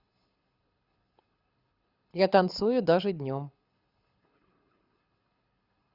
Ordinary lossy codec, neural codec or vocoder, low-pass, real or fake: Opus, 64 kbps; codec, 24 kHz, 6 kbps, HILCodec; 5.4 kHz; fake